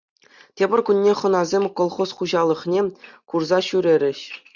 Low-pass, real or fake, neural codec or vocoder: 7.2 kHz; real; none